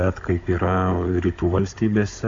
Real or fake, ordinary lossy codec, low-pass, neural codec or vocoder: fake; AAC, 48 kbps; 7.2 kHz; codec, 16 kHz, 16 kbps, FunCodec, trained on Chinese and English, 50 frames a second